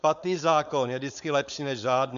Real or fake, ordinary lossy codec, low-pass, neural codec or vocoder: fake; AAC, 96 kbps; 7.2 kHz; codec, 16 kHz, 4.8 kbps, FACodec